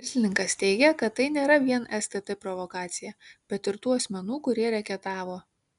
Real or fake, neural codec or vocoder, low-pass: real; none; 10.8 kHz